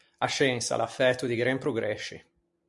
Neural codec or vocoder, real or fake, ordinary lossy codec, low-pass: none; real; MP3, 64 kbps; 10.8 kHz